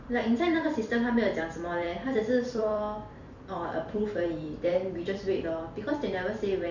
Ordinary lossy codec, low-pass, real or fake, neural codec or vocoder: none; 7.2 kHz; fake; vocoder, 44.1 kHz, 128 mel bands every 256 samples, BigVGAN v2